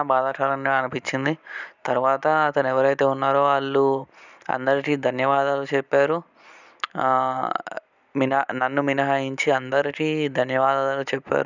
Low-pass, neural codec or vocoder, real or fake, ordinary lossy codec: 7.2 kHz; none; real; none